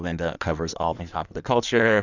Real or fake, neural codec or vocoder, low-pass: fake; codec, 16 kHz in and 24 kHz out, 1.1 kbps, FireRedTTS-2 codec; 7.2 kHz